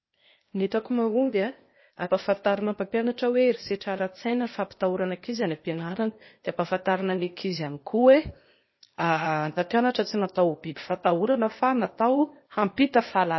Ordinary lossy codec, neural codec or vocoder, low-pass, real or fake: MP3, 24 kbps; codec, 16 kHz, 0.8 kbps, ZipCodec; 7.2 kHz; fake